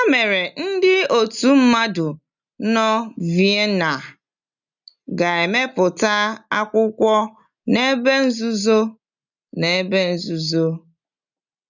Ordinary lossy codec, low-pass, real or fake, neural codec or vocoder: none; 7.2 kHz; real; none